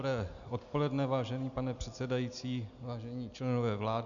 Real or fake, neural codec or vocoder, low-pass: real; none; 7.2 kHz